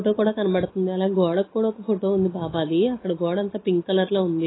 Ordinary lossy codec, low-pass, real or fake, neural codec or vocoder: AAC, 16 kbps; 7.2 kHz; real; none